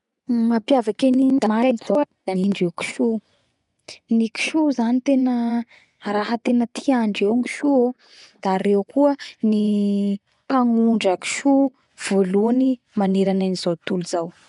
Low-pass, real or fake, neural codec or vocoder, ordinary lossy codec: 10.8 kHz; fake; vocoder, 24 kHz, 100 mel bands, Vocos; none